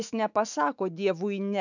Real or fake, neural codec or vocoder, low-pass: fake; autoencoder, 48 kHz, 128 numbers a frame, DAC-VAE, trained on Japanese speech; 7.2 kHz